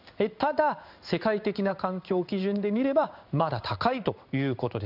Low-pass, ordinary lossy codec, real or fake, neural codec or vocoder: 5.4 kHz; none; fake; codec, 16 kHz in and 24 kHz out, 1 kbps, XY-Tokenizer